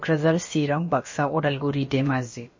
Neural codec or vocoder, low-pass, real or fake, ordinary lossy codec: codec, 16 kHz, about 1 kbps, DyCAST, with the encoder's durations; 7.2 kHz; fake; MP3, 32 kbps